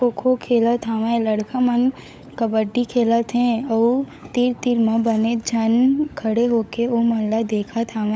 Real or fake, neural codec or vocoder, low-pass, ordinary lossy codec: fake; codec, 16 kHz, 16 kbps, FunCodec, trained on LibriTTS, 50 frames a second; none; none